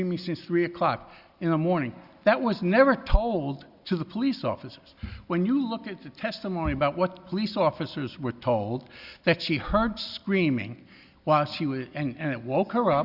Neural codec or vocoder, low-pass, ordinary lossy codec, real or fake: none; 5.4 kHz; Opus, 64 kbps; real